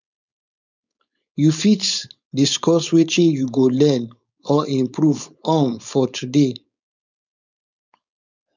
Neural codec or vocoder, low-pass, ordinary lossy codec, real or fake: codec, 16 kHz, 4.8 kbps, FACodec; 7.2 kHz; none; fake